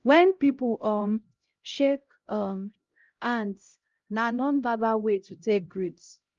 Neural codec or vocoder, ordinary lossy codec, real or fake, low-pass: codec, 16 kHz, 0.5 kbps, X-Codec, HuBERT features, trained on LibriSpeech; Opus, 32 kbps; fake; 7.2 kHz